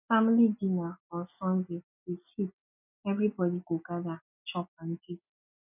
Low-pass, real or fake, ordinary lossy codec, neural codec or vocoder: 3.6 kHz; real; none; none